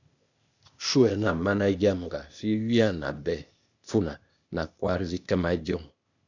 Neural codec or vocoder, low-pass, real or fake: codec, 16 kHz, 0.8 kbps, ZipCodec; 7.2 kHz; fake